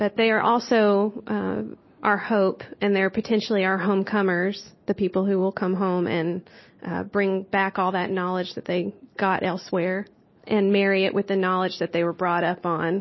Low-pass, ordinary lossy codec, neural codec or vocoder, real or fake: 7.2 kHz; MP3, 24 kbps; none; real